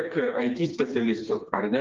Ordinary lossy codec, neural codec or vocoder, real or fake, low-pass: Opus, 32 kbps; codec, 16 kHz, 2 kbps, FreqCodec, smaller model; fake; 7.2 kHz